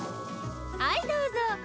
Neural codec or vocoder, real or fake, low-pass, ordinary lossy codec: none; real; none; none